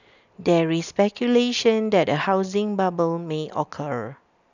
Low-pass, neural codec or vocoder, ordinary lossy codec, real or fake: 7.2 kHz; none; none; real